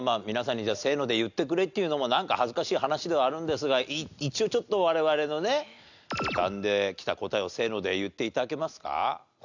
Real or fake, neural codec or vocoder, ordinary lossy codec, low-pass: real; none; none; 7.2 kHz